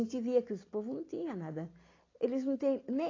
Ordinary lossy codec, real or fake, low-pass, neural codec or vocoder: AAC, 32 kbps; real; 7.2 kHz; none